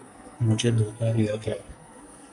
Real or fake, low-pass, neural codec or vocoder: fake; 10.8 kHz; codec, 44.1 kHz, 2.6 kbps, SNAC